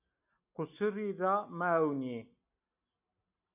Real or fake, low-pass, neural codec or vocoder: real; 3.6 kHz; none